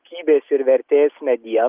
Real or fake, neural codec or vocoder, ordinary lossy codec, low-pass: real; none; Opus, 24 kbps; 3.6 kHz